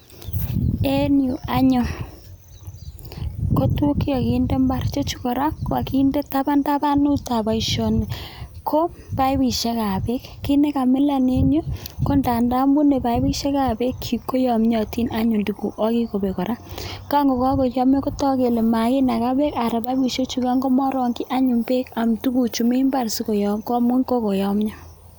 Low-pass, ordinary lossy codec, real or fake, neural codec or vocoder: none; none; real; none